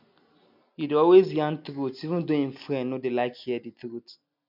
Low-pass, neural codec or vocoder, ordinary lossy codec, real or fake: 5.4 kHz; none; MP3, 48 kbps; real